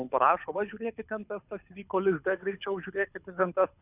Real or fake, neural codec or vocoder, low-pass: fake; codec, 24 kHz, 6 kbps, HILCodec; 3.6 kHz